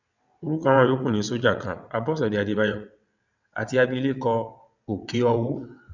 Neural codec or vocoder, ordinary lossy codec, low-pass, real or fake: vocoder, 22.05 kHz, 80 mel bands, WaveNeXt; none; 7.2 kHz; fake